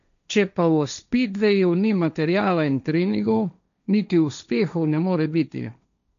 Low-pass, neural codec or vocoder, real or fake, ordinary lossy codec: 7.2 kHz; codec, 16 kHz, 1.1 kbps, Voila-Tokenizer; fake; none